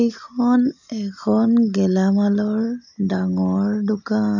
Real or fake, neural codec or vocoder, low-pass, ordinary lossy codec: real; none; 7.2 kHz; none